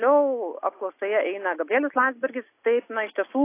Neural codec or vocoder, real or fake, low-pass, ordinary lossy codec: none; real; 3.6 kHz; AAC, 24 kbps